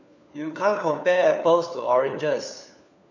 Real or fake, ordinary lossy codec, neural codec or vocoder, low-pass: fake; none; codec, 16 kHz, 4 kbps, FunCodec, trained on LibriTTS, 50 frames a second; 7.2 kHz